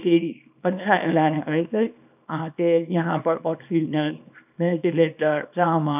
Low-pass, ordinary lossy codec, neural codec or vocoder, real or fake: 3.6 kHz; none; codec, 24 kHz, 0.9 kbps, WavTokenizer, small release; fake